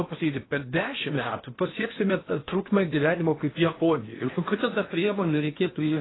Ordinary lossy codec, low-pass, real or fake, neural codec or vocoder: AAC, 16 kbps; 7.2 kHz; fake; codec, 16 kHz in and 24 kHz out, 0.8 kbps, FocalCodec, streaming, 65536 codes